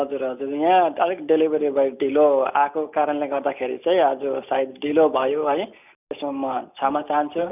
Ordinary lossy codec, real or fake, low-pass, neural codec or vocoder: none; real; 3.6 kHz; none